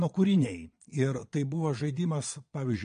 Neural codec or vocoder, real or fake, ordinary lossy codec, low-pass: vocoder, 22.05 kHz, 80 mel bands, Vocos; fake; MP3, 48 kbps; 9.9 kHz